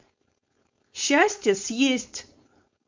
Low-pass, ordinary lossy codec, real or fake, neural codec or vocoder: 7.2 kHz; MP3, 64 kbps; fake; codec, 16 kHz, 4.8 kbps, FACodec